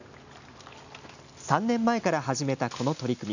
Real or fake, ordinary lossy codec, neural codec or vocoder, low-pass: real; none; none; 7.2 kHz